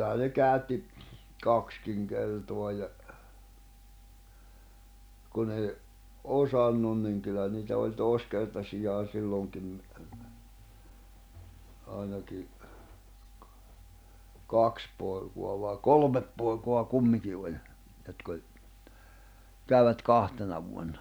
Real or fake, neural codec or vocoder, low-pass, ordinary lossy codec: real; none; none; none